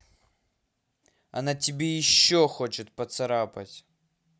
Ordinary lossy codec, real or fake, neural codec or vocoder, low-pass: none; real; none; none